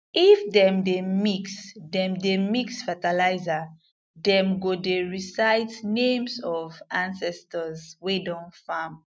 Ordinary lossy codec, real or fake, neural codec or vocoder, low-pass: none; real; none; none